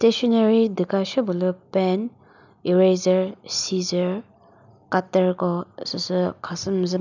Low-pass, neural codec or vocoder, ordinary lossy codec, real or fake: 7.2 kHz; none; none; real